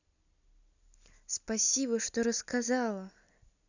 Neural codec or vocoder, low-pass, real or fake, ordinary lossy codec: none; 7.2 kHz; real; none